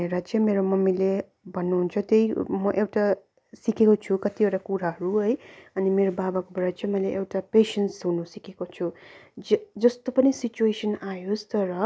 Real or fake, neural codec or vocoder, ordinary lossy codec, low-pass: real; none; none; none